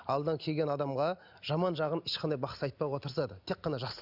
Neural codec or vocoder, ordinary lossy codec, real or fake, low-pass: none; none; real; 5.4 kHz